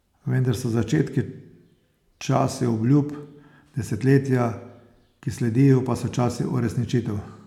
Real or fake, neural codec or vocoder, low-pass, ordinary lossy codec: real; none; 19.8 kHz; none